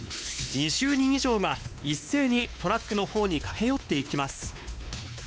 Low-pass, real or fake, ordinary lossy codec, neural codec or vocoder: none; fake; none; codec, 16 kHz, 2 kbps, X-Codec, WavLM features, trained on Multilingual LibriSpeech